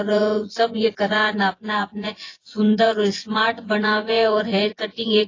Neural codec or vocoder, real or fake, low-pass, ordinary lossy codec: vocoder, 24 kHz, 100 mel bands, Vocos; fake; 7.2 kHz; AAC, 32 kbps